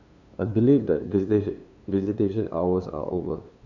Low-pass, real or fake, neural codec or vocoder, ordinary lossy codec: 7.2 kHz; fake; codec, 16 kHz, 2 kbps, FunCodec, trained on LibriTTS, 25 frames a second; none